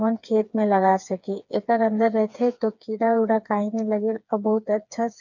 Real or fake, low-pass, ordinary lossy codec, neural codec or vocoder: fake; 7.2 kHz; none; codec, 16 kHz, 4 kbps, FreqCodec, smaller model